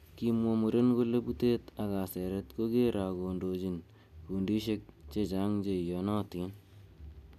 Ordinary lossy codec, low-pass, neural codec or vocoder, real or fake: none; 14.4 kHz; none; real